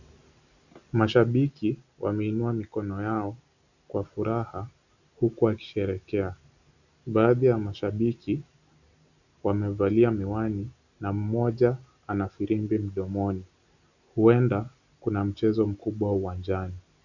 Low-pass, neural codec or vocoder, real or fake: 7.2 kHz; none; real